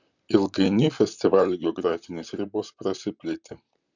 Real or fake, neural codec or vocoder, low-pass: fake; vocoder, 44.1 kHz, 128 mel bands, Pupu-Vocoder; 7.2 kHz